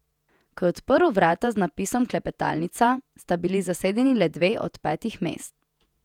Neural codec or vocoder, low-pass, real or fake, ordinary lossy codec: vocoder, 48 kHz, 128 mel bands, Vocos; 19.8 kHz; fake; none